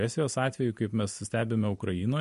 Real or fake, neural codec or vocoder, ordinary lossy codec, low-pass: real; none; MP3, 48 kbps; 14.4 kHz